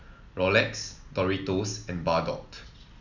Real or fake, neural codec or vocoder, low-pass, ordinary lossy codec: real; none; 7.2 kHz; none